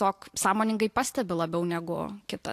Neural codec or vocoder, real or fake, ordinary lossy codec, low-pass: none; real; AAC, 64 kbps; 14.4 kHz